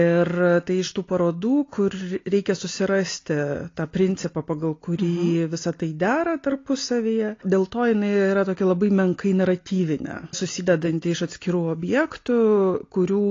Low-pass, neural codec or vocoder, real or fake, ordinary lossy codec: 7.2 kHz; none; real; AAC, 32 kbps